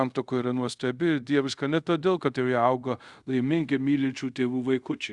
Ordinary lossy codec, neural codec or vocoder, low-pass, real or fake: Opus, 64 kbps; codec, 24 kHz, 0.5 kbps, DualCodec; 10.8 kHz; fake